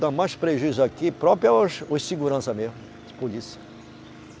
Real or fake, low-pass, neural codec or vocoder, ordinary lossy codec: real; none; none; none